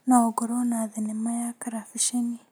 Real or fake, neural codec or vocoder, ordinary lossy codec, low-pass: real; none; none; none